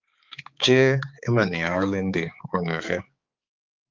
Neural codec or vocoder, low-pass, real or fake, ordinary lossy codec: codec, 16 kHz, 4 kbps, X-Codec, HuBERT features, trained on balanced general audio; 7.2 kHz; fake; Opus, 24 kbps